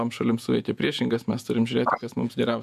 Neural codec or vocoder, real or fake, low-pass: vocoder, 48 kHz, 128 mel bands, Vocos; fake; 14.4 kHz